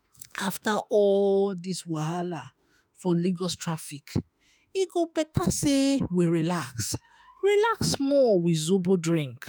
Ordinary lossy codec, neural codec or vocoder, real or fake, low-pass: none; autoencoder, 48 kHz, 32 numbers a frame, DAC-VAE, trained on Japanese speech; fake; none